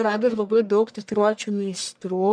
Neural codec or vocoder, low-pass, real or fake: codec, 44.1 kHz, 1.7 kbps, Pupu-Codec; 9.9 kHz; fake